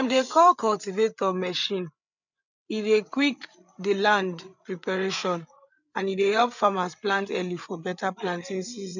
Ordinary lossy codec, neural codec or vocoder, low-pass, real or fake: none; codec, 16 kHz, 8 kbps, FreqCodec, larger model; 7.2 kHz; fake